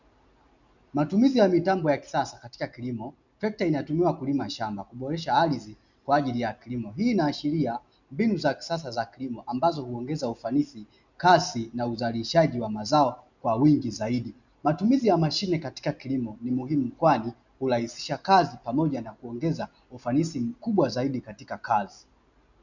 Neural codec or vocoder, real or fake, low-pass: none; real; 7.2 kHz